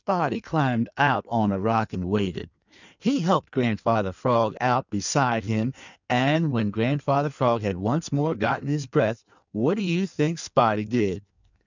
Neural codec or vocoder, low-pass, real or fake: codec, 16 kHz in and 24 kHz out, 1.1 kbps, FireRedTTS-2 codec; 7.2 kHz; fake